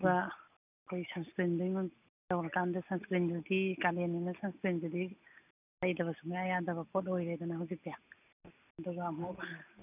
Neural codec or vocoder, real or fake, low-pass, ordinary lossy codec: none; real; 3.6 kHz; none